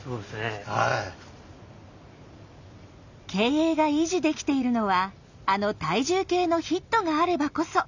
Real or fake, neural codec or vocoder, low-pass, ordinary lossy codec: real; none; 7.2 kHz; none